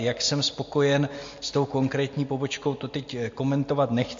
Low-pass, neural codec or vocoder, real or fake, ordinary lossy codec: 7.2 kHz; none; real; MP3, 48 kbps